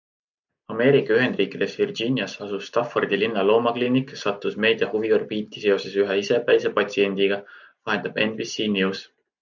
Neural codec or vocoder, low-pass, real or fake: none; 7.2 kHz; real